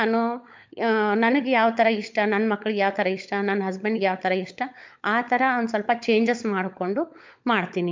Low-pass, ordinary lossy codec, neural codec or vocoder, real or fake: 7.2 kHz; MP3, 64 kbps; codec, 16 kHz, 16 kbps, FunCodec, trained on LibriTTS, 50 frames a second; fake